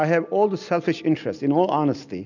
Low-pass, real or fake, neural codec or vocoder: 7.2 kHz; real; none